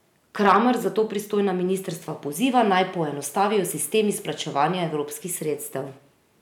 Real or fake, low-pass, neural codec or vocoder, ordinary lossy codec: real; 19.8 kHz; none; none